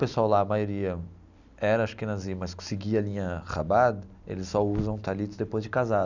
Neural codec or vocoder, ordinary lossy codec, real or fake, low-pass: none; none; real; 7.2 kHz